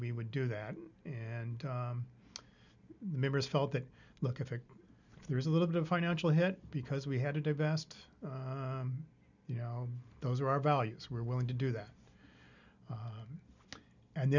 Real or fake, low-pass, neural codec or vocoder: real; 7.2 kHz; none